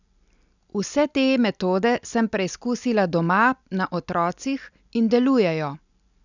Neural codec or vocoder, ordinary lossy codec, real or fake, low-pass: none; none; real; 7.2 kHz